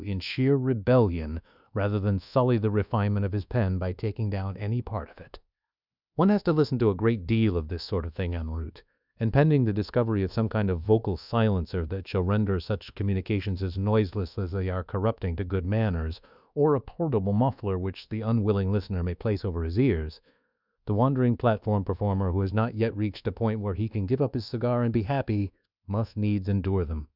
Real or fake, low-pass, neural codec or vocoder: fake; 5.4 kHz; codec, 24 kHz, 1.2 kbps, DualCodec